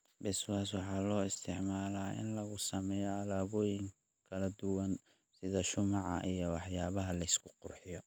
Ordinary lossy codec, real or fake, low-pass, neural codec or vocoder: none; real; none; none